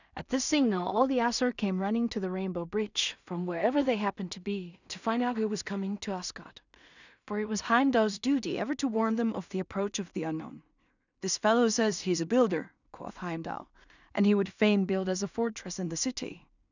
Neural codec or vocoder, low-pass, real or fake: codec, 16 kHz in and 24 kHz out, 0.4 kbps, LongCat-Audio-Codec, two codebook decoder; 7.2 kHz; fake